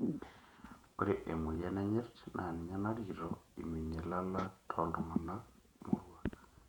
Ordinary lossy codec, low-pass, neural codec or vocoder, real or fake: none; 19.8 kHz; vocoder, 48 kHz, 128 mel bands, Vocos; fake